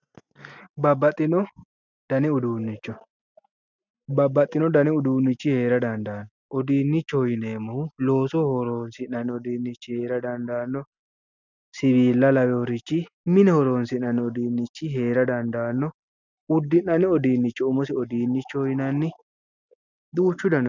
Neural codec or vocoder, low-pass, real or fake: none; 7.2 kHz; real